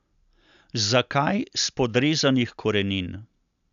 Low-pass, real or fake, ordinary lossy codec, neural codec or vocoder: 7.2 kHz; real; none; none